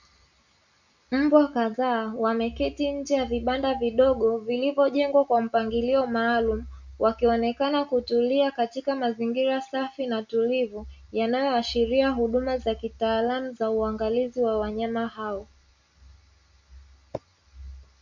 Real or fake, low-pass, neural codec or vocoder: real; 7.2 kHz; none